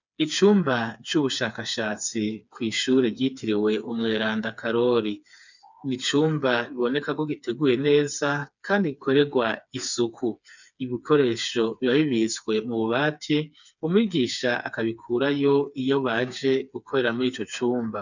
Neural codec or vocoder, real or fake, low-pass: codec, 16 kHz, 4 kbps, FreqCodec, smaller model; fake; 7.2 kHz